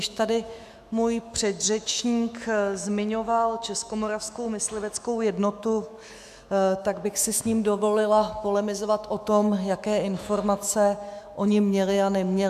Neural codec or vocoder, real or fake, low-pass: autoencoder, 48 kHz, 128 numbers a frame, DAC-VAE, trained on Japanese speech; fake; 14.4 kHz